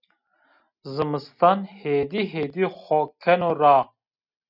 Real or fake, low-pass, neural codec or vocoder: real; 5.4 kHz; none